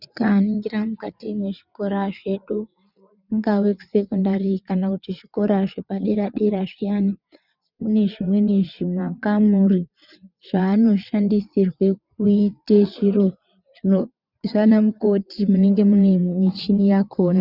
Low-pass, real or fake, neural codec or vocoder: 5.4 kHz; fake; vocoder, 22.05 kHz, 80 mel bands, WaveNeXt